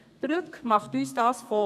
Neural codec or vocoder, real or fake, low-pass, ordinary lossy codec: codec, 32 kHz, 1.9 kbps, SNAC; fake; 14.4 kHz; none